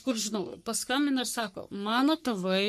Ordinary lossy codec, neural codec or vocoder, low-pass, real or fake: MP3, 64 kbps; codec, 44.1 kHz, 3.4 kbps, Pupu-Codec; 14.4 kHz; fake